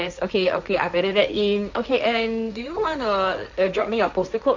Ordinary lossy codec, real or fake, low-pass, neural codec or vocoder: none; fake; 7.2 kHz; codec, 16 kHz, 1.1 kbps, Voila-Tokenizer